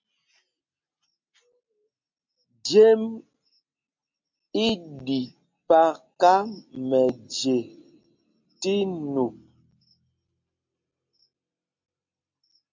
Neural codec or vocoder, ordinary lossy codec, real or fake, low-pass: none; MP3, 64 kbps; real; 7.2 kHz